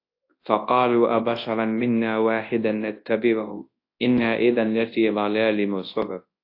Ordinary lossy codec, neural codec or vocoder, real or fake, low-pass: AAC, 32 kbps; codec, 24 kHz, 0.9 kbps, WavTokenizer, large speech release; fake; 5.4 kHz